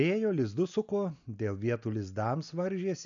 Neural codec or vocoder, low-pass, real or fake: none; 7.2 kHz; real